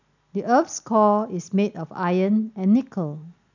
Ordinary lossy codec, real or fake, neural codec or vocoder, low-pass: none; real; none; 7.2 kHz